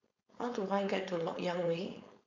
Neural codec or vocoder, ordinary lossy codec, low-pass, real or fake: codec, 16 kHz, 4.8 kbps, FACodec; none; 7.2 kHz; fake